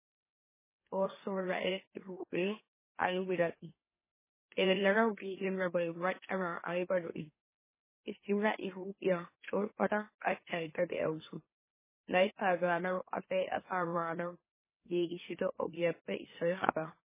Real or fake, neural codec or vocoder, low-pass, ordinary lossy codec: fake; autoencoder, 44.1 kHz, a latent of 192 numbers a frame, MeloTTS; 3.6 kHz; MP3, 16 kbps